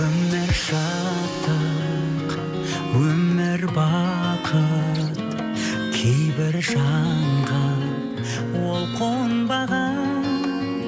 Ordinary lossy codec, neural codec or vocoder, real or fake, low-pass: none; none; real; none